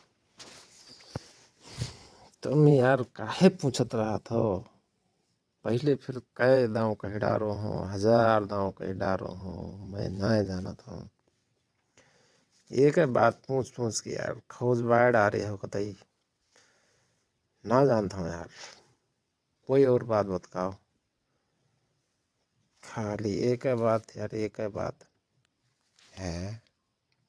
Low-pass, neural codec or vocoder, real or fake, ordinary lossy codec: none; vocoder, 22.05 kHz, 80 mel bands, WaveNeXt; fake; none